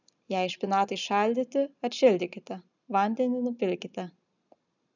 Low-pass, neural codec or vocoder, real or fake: 7.2 kHz; none; real